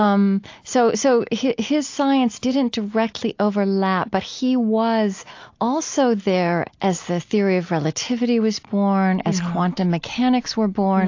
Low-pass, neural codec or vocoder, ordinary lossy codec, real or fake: 7.2 kHz; none; AAC, 48 kbps; real